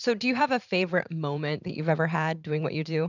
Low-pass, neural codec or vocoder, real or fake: 7.2 kHz; vocoder, 44.1 kHz, 128 mel bands every 512 samples, BigVGAN v2; fake